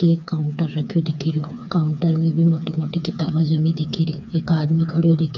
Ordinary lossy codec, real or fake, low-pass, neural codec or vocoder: none; fake; 7.2 kHz; codec, 16 kHz, 4 kbps, FreqCodec, smaller model